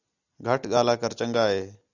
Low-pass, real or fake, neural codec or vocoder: 7.2 kHz; real; none